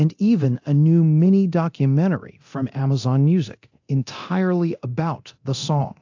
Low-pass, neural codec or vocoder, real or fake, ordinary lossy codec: 7.2 kHz; codec, 24 kHz, 0.9 kbps, DualCodec; fake; AAC, 48 kbps